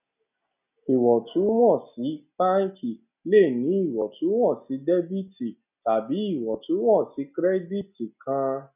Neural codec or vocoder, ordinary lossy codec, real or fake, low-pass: none; none; real; 3.6 kHz